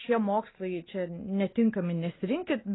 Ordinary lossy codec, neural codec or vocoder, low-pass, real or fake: AAC, 16 kbps; none; 7.2 kHz; real